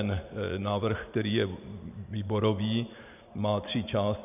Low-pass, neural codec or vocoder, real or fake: 3.6 kHz; vocoder, 44.1 kHz, 128 mel bands every 512 samples, BigVGAN v2; fake